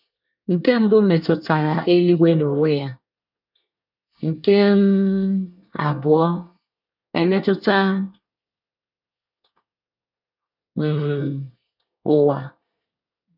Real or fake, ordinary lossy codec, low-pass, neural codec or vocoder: fake; none; 5.4 kHz; codec, 24 kHz, 1 kbps, SNAC